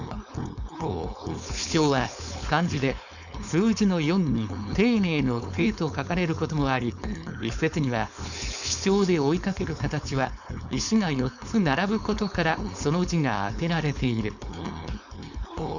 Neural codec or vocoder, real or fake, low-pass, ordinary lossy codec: codec, 16 kHz, 4.8 kbps, FACodec; fake; 7.2 kHz; none